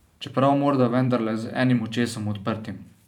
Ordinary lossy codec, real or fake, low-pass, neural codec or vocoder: none; fake; 19.8 kHz; vocoder, 44.1 kHz, 128 mel bands every 512 samples, BigVGAN v2